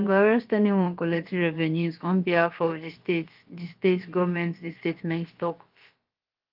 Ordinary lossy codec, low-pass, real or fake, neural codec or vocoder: Opus, 32 kbps; 5.4 kHz; fake; codec, 16 kHz, about 1 kbps, DyCAST, with the encoder's durations